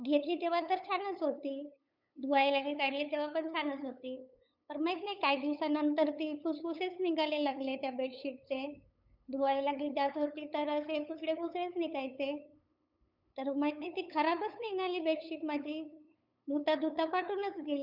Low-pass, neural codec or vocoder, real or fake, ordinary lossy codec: 5.4 kHz; codec, 16 kHz, 8 kbps, FunCodec, trained on LibriTTS, 25 frames a second; fake; none